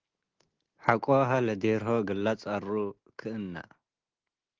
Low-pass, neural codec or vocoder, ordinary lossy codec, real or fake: 7.2 kHz; none; Opus, 16 kbps; real